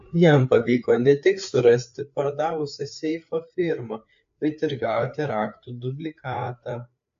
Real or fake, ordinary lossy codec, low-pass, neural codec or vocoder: fake; AAC, 64 kbps; 7.2 kHz; codec, 16 kHz, 4 kbps, FreqCodec, larger model